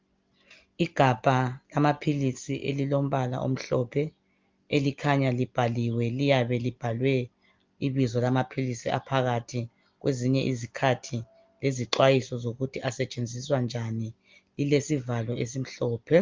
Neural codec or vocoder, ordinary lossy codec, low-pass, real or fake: none; Opus, 24 kbps; 7.2 kHz; real